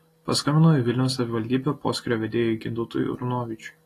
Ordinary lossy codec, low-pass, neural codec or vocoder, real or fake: AAC, 48 kbps; 14.4 kHz; none; real